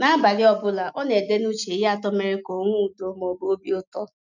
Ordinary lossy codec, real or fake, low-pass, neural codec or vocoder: none; real; 7.2 kHz; none